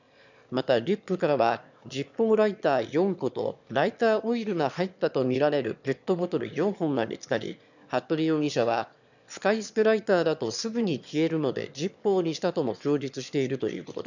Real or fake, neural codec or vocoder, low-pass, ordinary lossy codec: fake; autoencoder, 22.05 kHz, a latent of 192 numbers a frame, VITS, trained on one speaker; 7.2 kHz; none